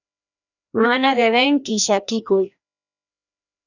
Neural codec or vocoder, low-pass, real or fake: codec, 16 kHz, 1 kbps, FreqCodec, larger model; 7.2 kHz; fake